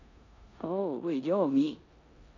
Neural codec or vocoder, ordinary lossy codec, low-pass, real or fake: codec, 16 kHz in and 24 kHz out, 0.9 kbps, LongCat-Audio-Codec, four codebook decoder; none; 7.2 kHz; fake